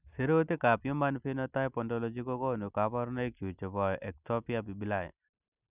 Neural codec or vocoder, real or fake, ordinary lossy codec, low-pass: none; real; none; 3.6 kHz